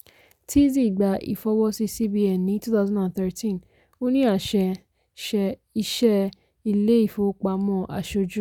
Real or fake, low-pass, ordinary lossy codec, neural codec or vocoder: real; 19.8 kHz; none; none